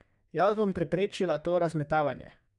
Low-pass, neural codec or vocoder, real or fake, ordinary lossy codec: 10.8 kHz; codec, 32 kHz, 1.9 kbps, SNAC; fake; none